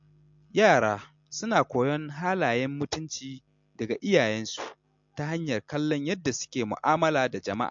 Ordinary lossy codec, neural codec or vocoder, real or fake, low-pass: MP3, 48 kbps; none; real; 7.2 kHz